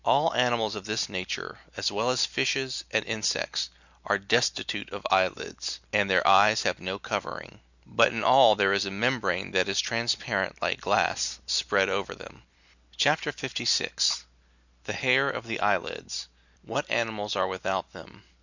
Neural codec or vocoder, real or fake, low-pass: none; real; 7.2 kHz